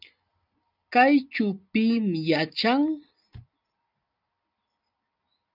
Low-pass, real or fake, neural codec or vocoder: 5.4 kHz; real; none